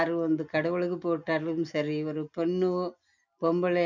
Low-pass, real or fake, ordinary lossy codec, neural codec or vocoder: 7.2 kHz; real; none; none